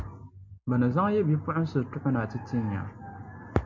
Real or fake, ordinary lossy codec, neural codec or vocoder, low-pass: real; MP3, 64 kbps; none; 7.2 kHz